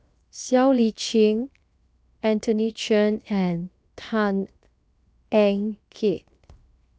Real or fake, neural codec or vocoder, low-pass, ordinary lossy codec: fake; codec, 16 kHz, 0.7 kbps, FocalCodec; none; none